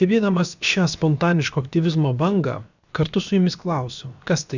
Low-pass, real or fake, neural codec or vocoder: 7.2 kHz; fake; codec, 16 kHz, about 1 kbps, DyCAST, with the encoder's durations